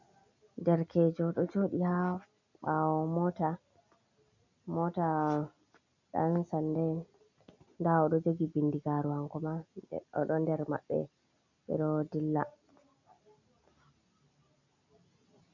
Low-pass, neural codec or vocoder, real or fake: 7.2 kHz; none; real